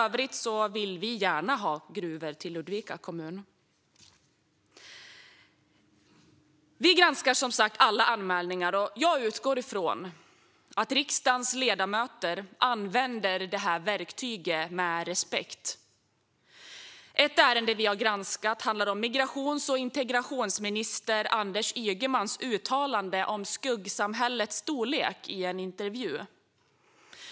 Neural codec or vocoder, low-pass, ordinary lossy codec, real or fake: none; none; none; real